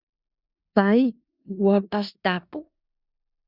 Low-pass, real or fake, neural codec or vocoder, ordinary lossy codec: 5.4 kHz; fake; codec, 16 kHz in and 24 kHz out, 0.4 kbps, LongCat-Audio-Codec, four codebook decoder; Opus, 64 kbps